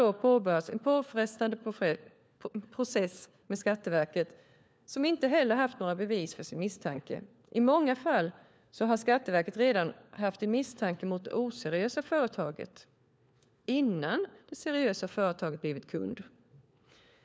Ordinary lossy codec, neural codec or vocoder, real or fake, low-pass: none; codec, 16 kHz, 4 kbps, FunCodec, trained on LibriTTS, 50 frames a second; fake; none